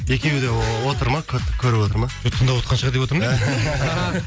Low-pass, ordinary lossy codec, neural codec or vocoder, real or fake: none; none; none; real